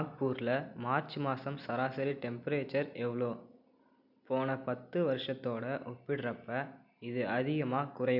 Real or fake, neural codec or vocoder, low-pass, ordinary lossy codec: real; none; 5.4 kHz; none